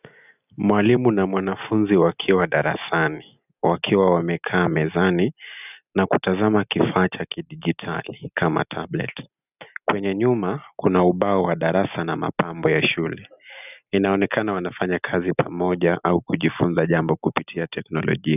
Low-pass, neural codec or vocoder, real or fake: 3.6 kHz; none; real